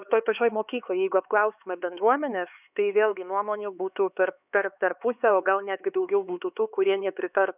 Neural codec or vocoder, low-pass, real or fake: codec, 16 kHz, 4 kbps, X-Codec, HuBERT features, trained on LibriSpeech; 3.6 kHz; fake